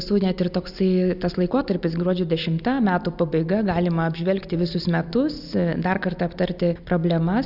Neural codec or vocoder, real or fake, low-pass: none; real; 5.4 kHz